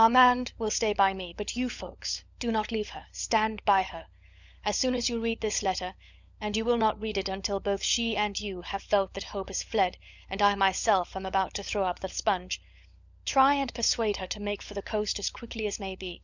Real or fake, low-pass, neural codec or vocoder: fake; 7.2 kHz; codec, 16 kHz, 16 kbps, FunCodec, trained on LibriTTS, 50 frames a second